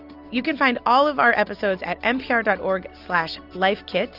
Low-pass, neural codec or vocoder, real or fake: 5.4 kHz; none; real